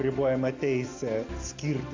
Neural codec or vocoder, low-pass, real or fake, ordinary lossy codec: none; 7.2 kHz; real; AAC, 32 kbps